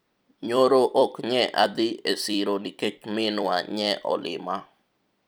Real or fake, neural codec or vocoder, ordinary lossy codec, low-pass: fake; vocoder, 44.1 kHz, 128 mel bands every 256 samples, BigVGAN v2; none; none